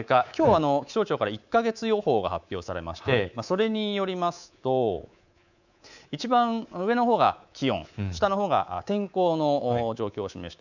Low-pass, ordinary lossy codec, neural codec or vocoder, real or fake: 7.2 kHz; none; codec, 24 kHz, 3.1 kbps, DualCodec; fake